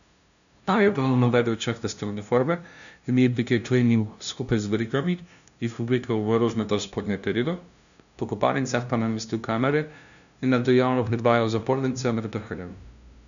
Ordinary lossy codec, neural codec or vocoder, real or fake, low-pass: none; codec, 16 kHz, 0.5 kbps, FunCodec, trained on LibriTTS, 25 frames a second; fake; 7.2 kHz